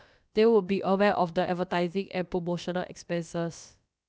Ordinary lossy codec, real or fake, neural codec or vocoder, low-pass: none; fake; codec, 16 kHz, about 1 kbps, DyCAST, with the encoder's durations; none